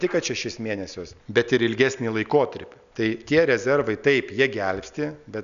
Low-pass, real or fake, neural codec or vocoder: 7.2 kHz; real; none